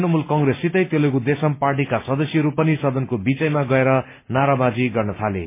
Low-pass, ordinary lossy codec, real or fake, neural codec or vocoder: 3.6 kHz; MP3, 24 kbps; real; none